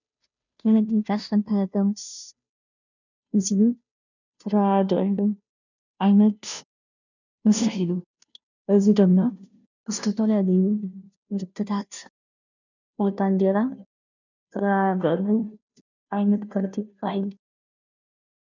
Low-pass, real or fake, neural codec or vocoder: 7.2 kHz; fake; codec, 16 kHz, 0.5 kbps, FunCodec, trained on Chinese and English, 25 frames a second